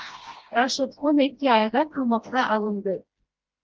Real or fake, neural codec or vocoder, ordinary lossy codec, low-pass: fake; codec, 16 kHz, 1 kbps, FreqCodec, smaller model; Opus, 24 kbps; 7.2 kHz